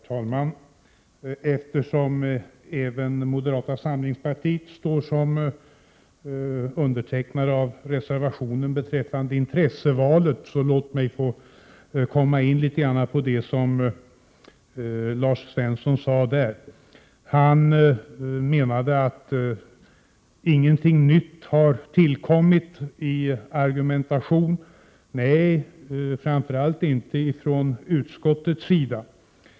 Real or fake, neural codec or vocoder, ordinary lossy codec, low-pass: real; none; none; none